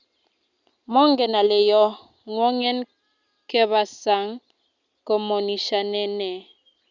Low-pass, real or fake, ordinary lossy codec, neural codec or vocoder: 7.2 kHz; real; Opus, 64 kbps; none